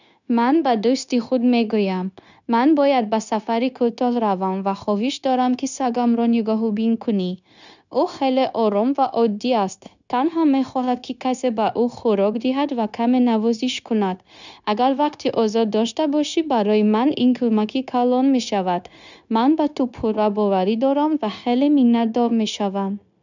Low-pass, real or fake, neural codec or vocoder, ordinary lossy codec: 7.2 kHz; fake; codec, 16 kHz, 0.9 kbps, LongCat-Audio-Codec; none